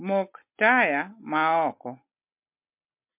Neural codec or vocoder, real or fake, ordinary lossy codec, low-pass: none; real; MP3, 32 kbps; 3.6 kHz